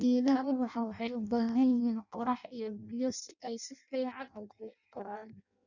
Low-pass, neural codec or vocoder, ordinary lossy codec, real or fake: 7.2 kHz; codec, 16 kHz in and 24 kHz out, 0.6 kbps, FireRedTTS-2 codec; none; fake